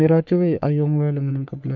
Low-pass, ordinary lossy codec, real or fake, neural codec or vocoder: 7.2 kHz; none; fake; codec, 44.1 kHz, 3.4 kbps, Pupu-Codec